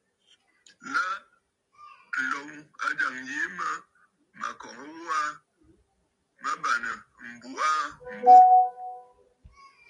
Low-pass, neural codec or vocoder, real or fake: 10.8 kHz; none; real